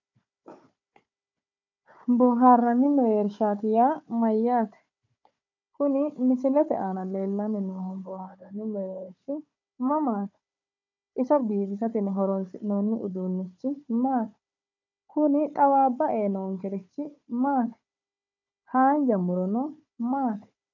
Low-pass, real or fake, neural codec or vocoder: 7.2 kHz; fake; codec, 16 kHz, 4 kbps, FunCodec, trained on Chinese and English, 50 frames a second